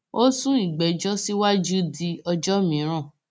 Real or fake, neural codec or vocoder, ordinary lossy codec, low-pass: real; none; none; none